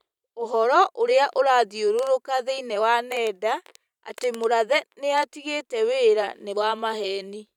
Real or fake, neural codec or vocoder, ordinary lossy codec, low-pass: fake; vocoder, 44.1 kHz, 128 mel bands every 512 samples, BigVGAN v2; none; 19.8 kHz